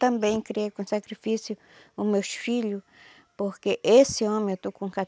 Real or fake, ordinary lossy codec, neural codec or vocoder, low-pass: real; none; none; none